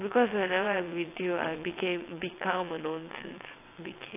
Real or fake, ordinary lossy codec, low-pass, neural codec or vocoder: fake; AAC, 24 kbps; 3.6 kHz; vocoder, 22.05 kHz, 80 mel bands, WaveNeXt